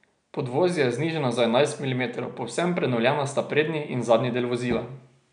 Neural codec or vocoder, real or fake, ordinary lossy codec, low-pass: none; real; none; 9.9 kHz